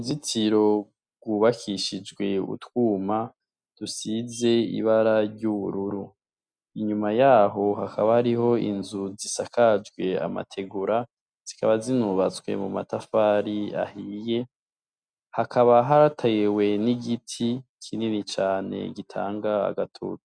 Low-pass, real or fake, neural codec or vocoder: 9.9 kHz; real; none